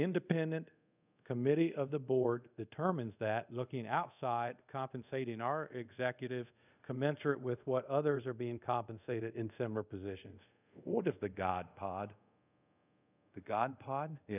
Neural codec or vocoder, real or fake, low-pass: codec, 24 kHz, 0.5 kbps, DualCodec; fake; 3.6 kHz